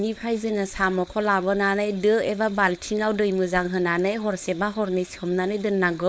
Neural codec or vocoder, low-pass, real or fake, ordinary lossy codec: codec, 16 kHz, 4.8 kbps, FACodec; none; fake; none